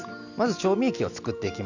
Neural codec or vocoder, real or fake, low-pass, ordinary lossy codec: none; real; 7.2 kHz; none